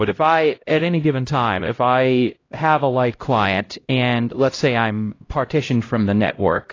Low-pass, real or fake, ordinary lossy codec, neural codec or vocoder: 7.2 kHz; fake; AAC, 32 kbps; codec, 16 kHz, 0.5 kbps, X-Codec, HuBERT features, trained on LibriSpeech